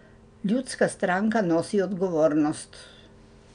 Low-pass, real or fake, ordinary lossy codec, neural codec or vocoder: 9.9 kHz; real; none; none